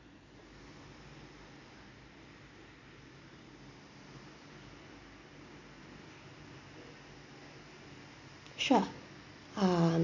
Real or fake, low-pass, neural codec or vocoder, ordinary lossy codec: real; 7.2 kHz; none; none